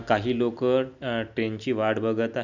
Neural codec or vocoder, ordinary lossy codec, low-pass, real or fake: none; none; 7.2 kHz; real